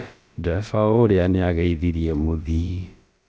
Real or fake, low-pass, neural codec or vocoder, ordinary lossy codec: fake; none; codec, 16 kHz, about 1 kbps, DyCAST, with the encoder's durations; none